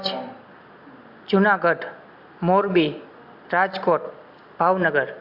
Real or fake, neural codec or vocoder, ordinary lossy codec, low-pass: real; none; AAC, 48 kbps; 5.4 kHz